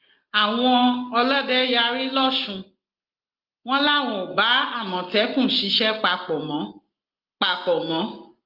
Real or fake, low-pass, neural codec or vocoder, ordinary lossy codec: real; 5.4 kHz; none; Opus, 32 kbps